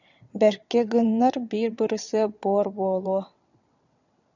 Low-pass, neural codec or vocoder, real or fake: 7.2 kHz; vocoder, 22.05 kHz, 80 mel bands, WaveNeXt; fake